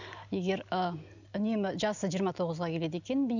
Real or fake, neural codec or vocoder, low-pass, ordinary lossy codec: real; none; 7.2 kHz; none